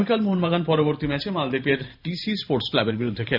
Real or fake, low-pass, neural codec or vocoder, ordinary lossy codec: fake; 5.4 kHz; vocoder, 44.1 kHz, 128 mel bands every 512 samples, BigVGAN v2; AAC, 48 kbps